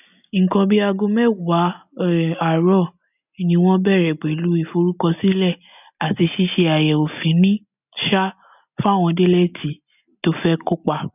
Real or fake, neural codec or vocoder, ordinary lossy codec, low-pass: real; none; none; 3.6 kHz